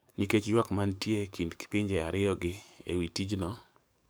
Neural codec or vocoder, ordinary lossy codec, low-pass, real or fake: codec, 44.1 kHz, 7.8 kbps, DAC; none; none; fake